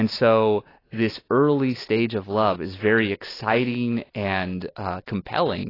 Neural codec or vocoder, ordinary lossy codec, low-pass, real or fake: none; AAC, 24 kbps; 5.4 kHz; real